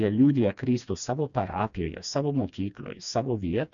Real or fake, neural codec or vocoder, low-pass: fake; codec, 16 kHz, 2 kbps, FreqCodec, smaller model; 7.2 kHz